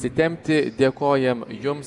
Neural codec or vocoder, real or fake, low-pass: none; real; 10.8 kHz